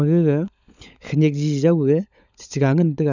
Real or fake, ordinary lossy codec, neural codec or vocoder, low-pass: fake; none; codec, 16 kHz, 16 kbps, FunCodec, trained on LibriTTS, 50 frames a second; 7.2 kHz